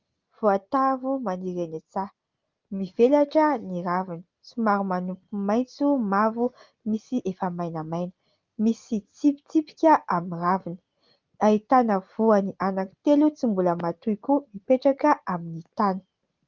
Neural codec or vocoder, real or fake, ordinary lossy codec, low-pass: none; real; Opus, 32 kbps; 7.2 kHz